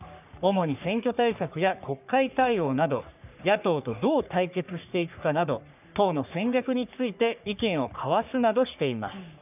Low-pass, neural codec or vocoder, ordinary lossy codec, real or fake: 3.6 kHz; codec, 44.1 kHz, 3.4 kbps, Pupu-Codec; none; fake